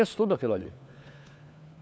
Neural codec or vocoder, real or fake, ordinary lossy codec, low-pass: codec, 16 kHz, 4 kbps, FunCodec, trained on LibriTTS, 50 frames a second; fake; none; none